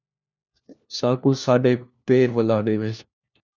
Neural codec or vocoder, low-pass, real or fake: codec, 16 kHz, 1 kbps, FunCodec, trained on LibriTTS, 50 frames a second; 7.2 kHz; fake